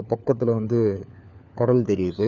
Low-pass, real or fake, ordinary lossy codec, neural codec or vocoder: none; fake; none; codec, 16 kHz, 4 kbps, FunCodec, trained on LibriTTS, 50 frames a second